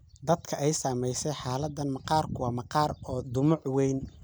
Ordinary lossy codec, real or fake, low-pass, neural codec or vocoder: none; real; none; none